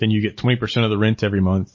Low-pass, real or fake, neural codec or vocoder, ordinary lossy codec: 7.2 kHz; real; none; MP3, 32 kbps